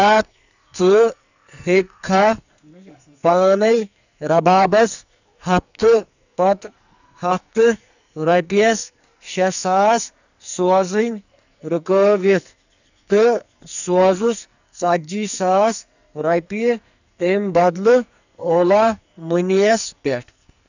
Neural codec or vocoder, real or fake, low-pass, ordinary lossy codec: codec, 44.1 kHz, 2.6 kbps, SNAC; fake; 7.2 kHz; none